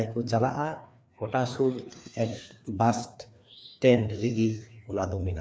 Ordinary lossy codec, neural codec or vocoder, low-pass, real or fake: none; codec, 16 kHz, 2 kbps, FreqCodec, larger model; none; fake